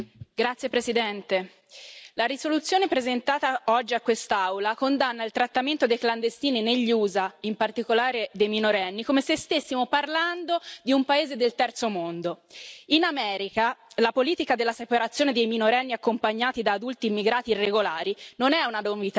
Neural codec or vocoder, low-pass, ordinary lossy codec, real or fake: none; none; none; real